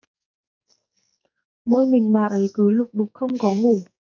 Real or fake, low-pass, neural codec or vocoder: fake; 7.2 kHz; codec, 44.1 kHz, 2.6 kbps, SNAC